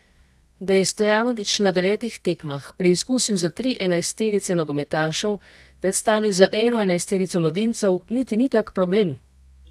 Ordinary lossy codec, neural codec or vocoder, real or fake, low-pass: none; codec, 24 kHz, 0.9 kbps, WavTokenizer, medium music audio release; fake; none